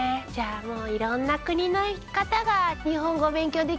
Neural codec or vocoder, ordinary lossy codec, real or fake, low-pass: none; none; real; none